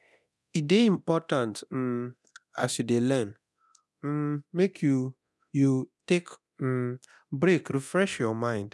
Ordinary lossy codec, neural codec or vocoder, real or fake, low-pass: none; codec, 24 kHz, 0.9 kbps, DualCodec; fake; none